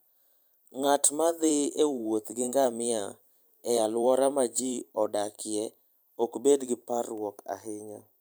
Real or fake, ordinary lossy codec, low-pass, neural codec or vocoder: fake; none; none; vocoder, 44.1 kHz, 128 mel bands every 512 samples, BigVGAN v2